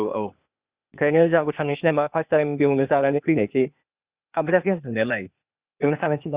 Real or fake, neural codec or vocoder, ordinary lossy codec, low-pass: fake; codec, 16 kHz, 0.8 kbps, ZipCodec; Opus, 64 kbps; 3.6 kHz